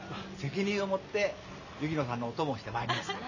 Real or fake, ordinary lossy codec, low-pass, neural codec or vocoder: real; none; 7.2 kHz; none